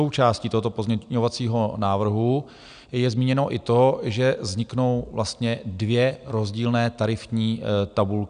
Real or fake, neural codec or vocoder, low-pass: real; none; 9.9 kHz